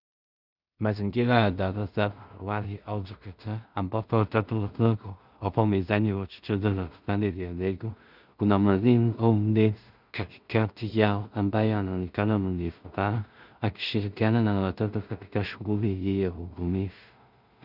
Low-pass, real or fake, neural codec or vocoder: 5.4 kHz; fake; codec, 16 kHz in and 24 kHz out, 0.4 kbps, LongCat-Audio-Codec, two codebook decoder